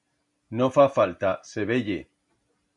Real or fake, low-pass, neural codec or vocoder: real; 10.8 kHz; none